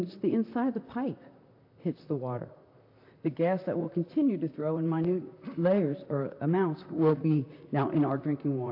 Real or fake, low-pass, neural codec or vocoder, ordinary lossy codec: fake; 5.4 kHz; vocoder, 44.1 kHz, 128 mel bands, Pupu-Vocoder; AAC, 32 kbps